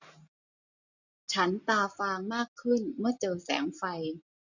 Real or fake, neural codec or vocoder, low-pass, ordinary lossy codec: real; none; 7.2 kHz; none